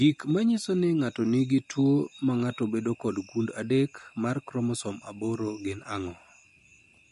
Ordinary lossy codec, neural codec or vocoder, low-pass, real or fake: MP3, 48 kbps; none; 14.4 kHz; real